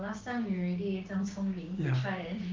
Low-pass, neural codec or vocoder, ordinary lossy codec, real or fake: 7.2 kHz; codec, 16 kHz, 6 kbps, DAC; Opus, 32 kbps; fake